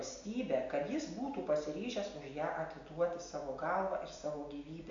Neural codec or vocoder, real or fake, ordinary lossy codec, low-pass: none; real; AAC, 64 kbps; 7.2 kHz